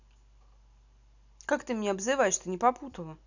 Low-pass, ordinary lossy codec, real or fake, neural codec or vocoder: 7.2 kHz; none; real; none